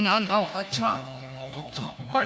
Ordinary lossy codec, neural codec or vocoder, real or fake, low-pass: none; codec, 16 kHz, 1 kbps, FunCodec, trained on LibriTTS, 50 frames a second; fake; none